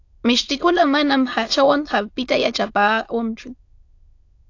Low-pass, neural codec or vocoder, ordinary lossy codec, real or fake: 7.2 kHz; autoencoder, 22.05 kHz, a latent of 192 numbers a frame, VITS, trained on many speakers; AAC, 48 kbps; fake